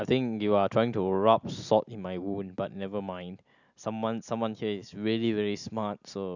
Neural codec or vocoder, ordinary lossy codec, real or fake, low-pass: none; none; real; 7.2 kHz